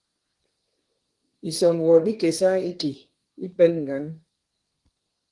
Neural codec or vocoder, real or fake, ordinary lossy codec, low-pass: codec, 24 kHz, 1 kbps, SNAC; fake; Opus, 24 kbps; 10.8 kHz